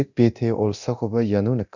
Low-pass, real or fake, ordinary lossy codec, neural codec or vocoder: 7.2 kHz; fake; none; codec, 24 kHz, 0.9 kbps, DualCodec